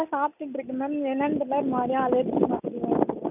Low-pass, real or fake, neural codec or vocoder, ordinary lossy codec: 3.6 kHz; real; none; none